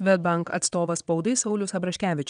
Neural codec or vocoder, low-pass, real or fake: vocoder, 22.05 kHz, 80 mel bands, WaveNeXt; 9.9 kHz; fake